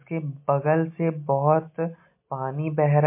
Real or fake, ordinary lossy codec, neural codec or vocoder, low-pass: real; MP3, 24 kbps; none; 3.6 kHz